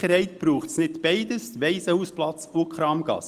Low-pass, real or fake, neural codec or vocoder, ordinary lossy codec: 14.4 kHz; real; none; Opus, 24 kbps